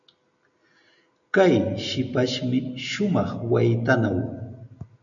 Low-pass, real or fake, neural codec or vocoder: 7.2 kHz; real; none